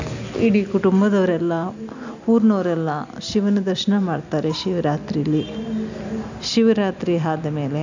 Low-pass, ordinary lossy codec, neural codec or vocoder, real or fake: 7.2 kHz; none; none; real